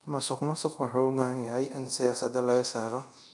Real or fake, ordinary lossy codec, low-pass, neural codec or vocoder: fake; none; 10.8 kHz; codec, 24 kHz, 0.5 kbps, DualCodec